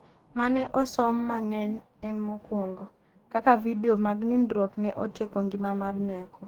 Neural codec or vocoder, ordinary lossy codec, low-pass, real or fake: codec, 44.1 kHz, 2.6 kbps, DAC; Opus, 24 kbps; 19.8 kHz; fake